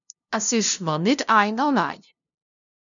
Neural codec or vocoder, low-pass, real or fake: codec, 16 kHz, 0.5 kbps, FunCodec, trained on LibriTTS, 25 frames a second; 7.2 kHz; fake